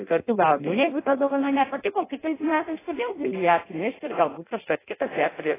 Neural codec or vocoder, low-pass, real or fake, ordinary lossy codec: codec, 16 kHz in and 24 kHz out, 0.6 kbps, FireRedTTS-2 codec; 3.6 kHz; fake; AAC, 16 kbps